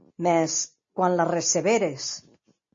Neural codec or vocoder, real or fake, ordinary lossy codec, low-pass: none; real; MP3, 32 kbps; 7.2 kHz